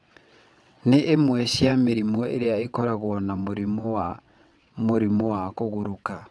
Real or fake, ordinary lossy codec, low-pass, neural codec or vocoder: fake; none; none; vocoder, 22.05 kHz, 80 mel bands, WaveNeXt